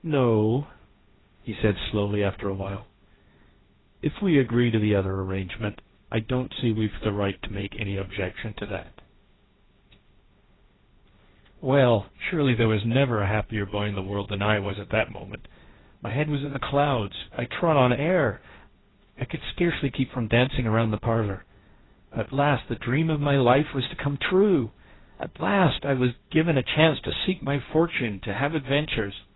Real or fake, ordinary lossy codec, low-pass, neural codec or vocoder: fake; AAC, 16 kbps; 7.2 kHz; codec, 16 kHz, 1.1 kbps, Voila-Tokenizer